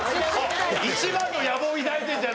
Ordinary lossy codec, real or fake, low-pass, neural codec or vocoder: none; real; none; none